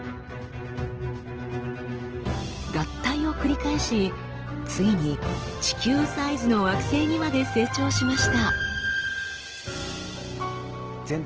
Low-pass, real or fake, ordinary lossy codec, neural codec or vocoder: 7.2 kHz; real; Opus, 16 kbps; none